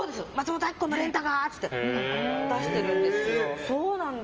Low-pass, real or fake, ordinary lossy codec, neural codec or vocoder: 7.2 kHz; real; Opus, 24 kbps; none